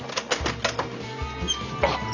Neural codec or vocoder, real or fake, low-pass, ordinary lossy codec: none; real; 7.2 kHz; Opus, 64 kbps